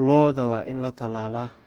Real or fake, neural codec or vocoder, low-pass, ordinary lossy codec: fake; codec, 44.1 kHz, 2.6 kbps, DAC; 19.8 kHz; Opus, 24 kbps